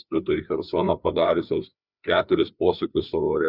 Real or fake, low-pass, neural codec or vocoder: fake; 5.4 kHz; codec, 16 kHz, 4 kbps, FreqCodec, larger model